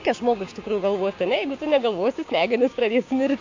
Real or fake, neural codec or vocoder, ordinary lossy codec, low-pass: fake; codec, 16 kHz, 6 kbps, DAC; AAC, 48 kbps; 7.2 kHz